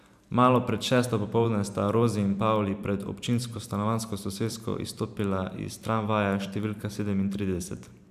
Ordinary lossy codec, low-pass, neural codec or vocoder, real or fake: none; 14.4 kHz; vocoder, 44.1 kHz, 128 mel bands every 512 samples, BigVGAN v2; fake